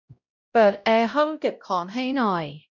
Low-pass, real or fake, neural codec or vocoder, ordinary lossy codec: 7.2 kHz; fake; codec, 16 kHz, 0.5 kbps, X-Codec, WavLM features, trained on Multilingual LibriSpeech; none